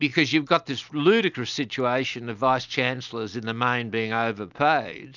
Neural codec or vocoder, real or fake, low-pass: none; real; 7.2 kHz